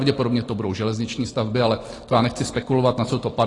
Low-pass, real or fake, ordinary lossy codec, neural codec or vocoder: 10.8 kHz; real; AAC, 32 kbps; none